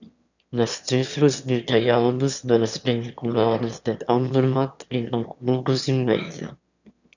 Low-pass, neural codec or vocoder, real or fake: 7.2 kHz; autoencoder, 22.05 kHz, a latent of 192 numbers a frame, VITS, trained on one speaker; fake